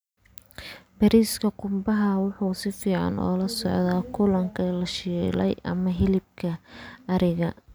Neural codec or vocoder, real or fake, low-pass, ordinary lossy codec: none; real; none; none